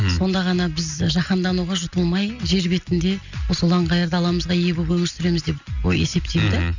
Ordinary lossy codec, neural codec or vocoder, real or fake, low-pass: none; none; real; 7.2 kHz